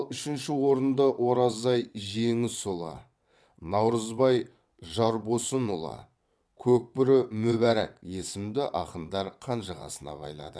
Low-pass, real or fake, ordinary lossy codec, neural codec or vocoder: none; fake; none; vocoder, 22.05 kHz, 80 mel bands, WaveNeXt